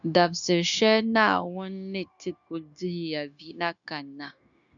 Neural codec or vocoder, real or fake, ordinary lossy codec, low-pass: codec, 16 kHz, 0.9 kbps, LongCat-Audio-Codec; fake; MP3, 96 kbps; 7.2 kHz